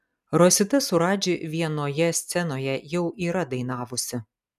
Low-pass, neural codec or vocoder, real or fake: 14.4 kHz; none; real